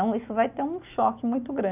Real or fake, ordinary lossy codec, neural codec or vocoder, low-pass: real; none; none; 3.6 kHz